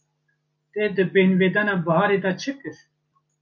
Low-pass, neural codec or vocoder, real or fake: 7.2 kHz; none; real